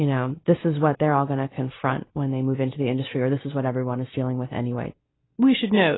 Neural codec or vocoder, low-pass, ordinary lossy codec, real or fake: none; 7.2 kHz; AAC, 16 kbps; real